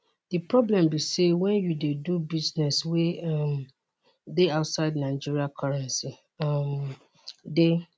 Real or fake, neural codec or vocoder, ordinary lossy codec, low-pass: real; none; none; none